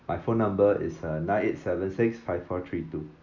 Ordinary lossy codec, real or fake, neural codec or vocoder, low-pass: none; real; none; 7.2 kHz